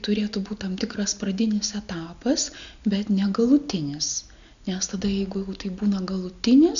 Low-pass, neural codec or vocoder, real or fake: 7.2 kHz; none; real